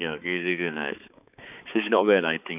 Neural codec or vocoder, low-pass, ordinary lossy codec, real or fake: codec, 16 kHz, 4 kbps, X-Codec, HuBERT features, trained on balanced general audio; 3.6 kHz; none; fake